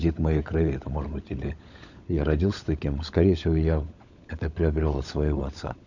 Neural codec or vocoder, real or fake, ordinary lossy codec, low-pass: codec, 16 kHz, 16 kbps, FunCodec, trained on LibriTTS, 50 frames a second; fake; none; 7.2 kHz